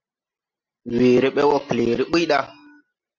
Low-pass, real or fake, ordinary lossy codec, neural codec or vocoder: 7.2 kHz; real; MP3, 64 kbps; none